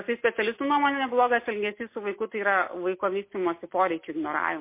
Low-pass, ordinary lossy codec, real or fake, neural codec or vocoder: 3.6 kHz; MP3, 24 kbps; real; none